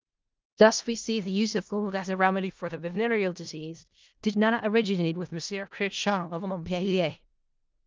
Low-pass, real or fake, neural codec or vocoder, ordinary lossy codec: 7.2 kHz; fake; codec, 16 kHz in and 24 kHz out, 0.4 kbps, LongCat-Audio-Codec, four codebook decoder; Opus, 24 kbps